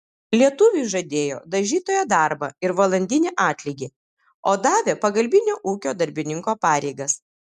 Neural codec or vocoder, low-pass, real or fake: none; 14.4 kHz; real